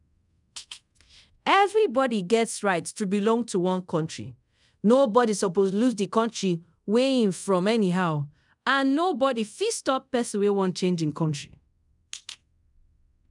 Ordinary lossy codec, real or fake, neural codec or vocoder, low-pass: none; fake; codec, 24 kHz, 0.5 kbps, DualCodec; 10.8 kHz